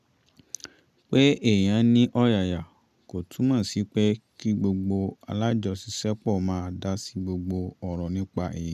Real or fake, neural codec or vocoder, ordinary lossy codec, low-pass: real; none; none; 14.4 kHz